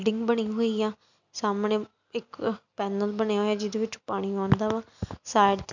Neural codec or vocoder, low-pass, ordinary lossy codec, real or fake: none; 7.2 kHz; none; real